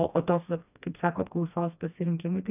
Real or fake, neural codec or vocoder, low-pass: fake; codec, 16 kHz, 2 kbps, FreqCodec, smaller model; 3.6 kHz